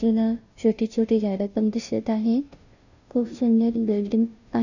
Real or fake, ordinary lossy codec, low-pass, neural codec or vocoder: fake; none; 7.2 kHz; codec, 16 kHz, 0.5 kbps, FunCodec, trained on Chinese and English, 25 frames a second